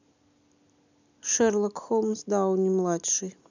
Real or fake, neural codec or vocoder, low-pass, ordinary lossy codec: real; none; 7.2 kHz; none